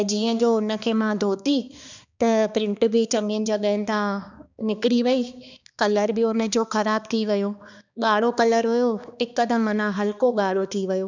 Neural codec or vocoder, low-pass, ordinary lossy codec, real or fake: codec, 16 kHz, 2 kbps, X-Codec, HuBERT features, trained on balanced general audio; 7.2 kHz; none; fake